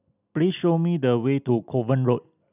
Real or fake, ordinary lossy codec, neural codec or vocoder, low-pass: real; none; none; 3.6 kHz